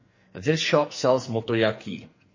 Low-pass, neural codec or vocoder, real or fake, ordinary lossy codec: 7.2 kHz; codec, 44.1 kHz, 2.6 kbps, SNAC; fake; MP3, 32 kbps